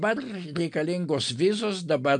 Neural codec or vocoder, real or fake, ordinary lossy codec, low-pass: none; real; MP3, 64 kbps; 9.9 kHz